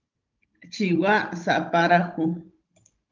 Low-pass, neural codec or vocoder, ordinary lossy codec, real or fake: 7.2 kHz; codec, 16 kHz, 16 kbps, FunCodec, trained on Chinese and English, 50 frames a second; Opus, 24 kbps; fake